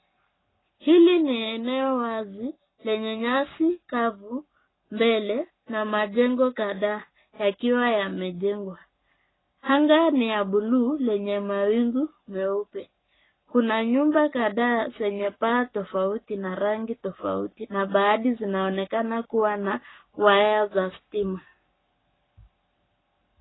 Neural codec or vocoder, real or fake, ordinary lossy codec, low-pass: codec, 44.1 kHz, 7.8 kbps, Pupu-Codec; fake; AAC, 16 kbps; 7.2 kHz